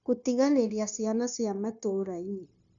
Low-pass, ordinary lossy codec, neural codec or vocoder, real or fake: 7.2 kHz; none; codec, 16 kHz, 0.9 kbps, LongCat-Audio-Codec; fake